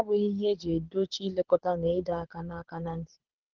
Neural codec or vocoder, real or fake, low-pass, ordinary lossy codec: codec, 24 kHz, 6 kbps, HILCodec; fake; 7.2 kHz; Opus, 16 kbps